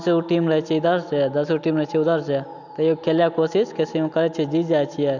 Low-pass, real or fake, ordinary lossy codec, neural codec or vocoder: 7.2 kHz; real; none; none